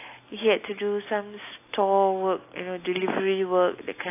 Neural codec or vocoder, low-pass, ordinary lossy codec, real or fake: none; 3.6 kHz; AAC, 24 kbps; real